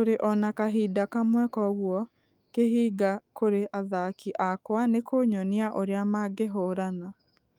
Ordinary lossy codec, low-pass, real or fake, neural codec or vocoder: Opus, 32 kbps; 19.8 kHz; fake; autoencoder, 48 kHz, 128 numbers a frame, DAC-VAE, trained on Japanese speech